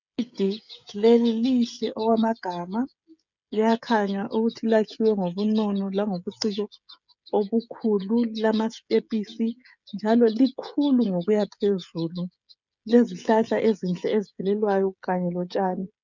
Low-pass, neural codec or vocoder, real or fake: 7.2 kHz; codec, 16 kHz, 16 kbps, FreqCodec, smaller model; fake